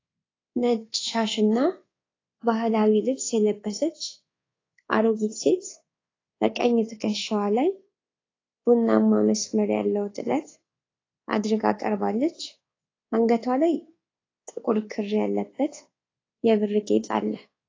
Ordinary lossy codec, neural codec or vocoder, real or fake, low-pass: AAC, 32 kbps; codec, 24 kHz, 1.2 kbps, DualCodec; fake; 7.2 kHz